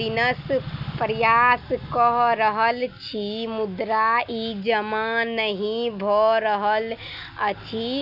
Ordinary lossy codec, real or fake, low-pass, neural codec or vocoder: none; real; 5.4 kHz; none